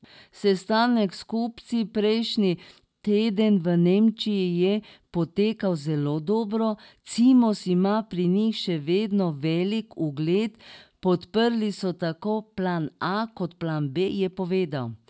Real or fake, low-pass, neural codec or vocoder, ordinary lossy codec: real; none; none; none